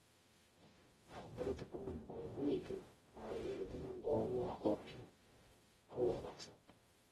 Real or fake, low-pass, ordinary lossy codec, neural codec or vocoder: fake; 19.8 kHz; AAC, 32 kbps; codec, 44.1 kHz, 0.9 kbps, DAC